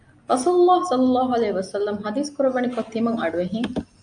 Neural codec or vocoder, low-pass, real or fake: none; 9.9 kHz; real